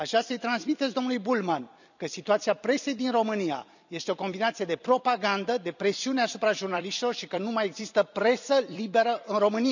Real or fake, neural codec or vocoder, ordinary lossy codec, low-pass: fake; vocoder, 44.1 kHz, 128 mel bands every 256 samples, BigVGAN v2; none; 7.2 kHz